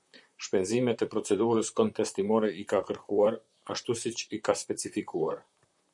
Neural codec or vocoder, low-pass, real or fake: vocoder, 44.1 kHz, 128 mel bands, Pupu-Vocoder; 10.8 kHz; fake